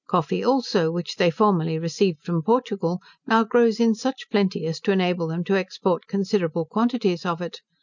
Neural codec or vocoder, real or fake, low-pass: none; real; 7.2 kHz